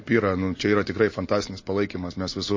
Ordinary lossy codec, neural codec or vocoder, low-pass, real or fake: MP3, 32 kbps; none; 7.2 kHz; real